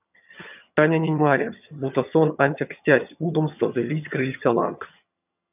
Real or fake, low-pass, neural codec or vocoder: fake; 3.6 kHz; vocoder, 22.05 kHz, 80 mel bands, HiFi-GAN